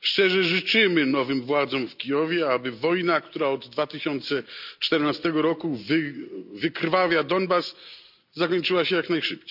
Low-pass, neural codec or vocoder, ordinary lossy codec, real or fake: 5.4 kHz; none; none; real